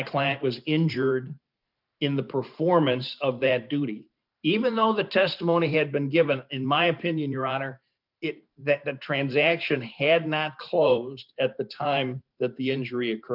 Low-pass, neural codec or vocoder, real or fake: 5.4 kHz; vocoder, 44.1 kHz, 128 mel bands every 512 samples, BigVGAN v2; fake